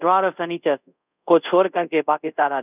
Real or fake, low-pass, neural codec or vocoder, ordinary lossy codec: fake; 3.6 kHz; codec, 24 kHz, 0.5 kbps, DualCodec; none